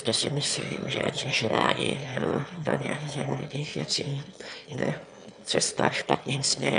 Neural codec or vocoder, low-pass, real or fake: autoencoder, 22.05 kHz, a latent of 192 numbers a frame, VITS, trained on one speaker; 9.9 kHz; fake